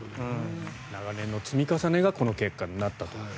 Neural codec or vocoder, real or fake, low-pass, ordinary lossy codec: none; real; none; none